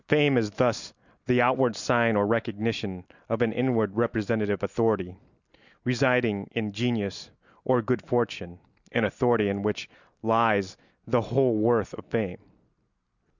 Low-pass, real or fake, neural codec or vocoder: 7.2 kHz; real; none